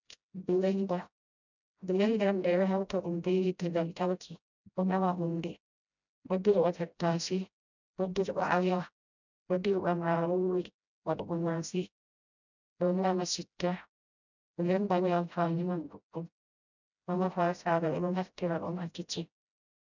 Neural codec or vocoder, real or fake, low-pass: codec, 16 kHz, 0.5 kbps, FreqCodec, smaller model; fake; 7.2 kHz